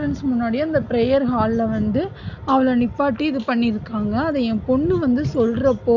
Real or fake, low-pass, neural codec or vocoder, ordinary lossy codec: real; 7.2 kHz; none; none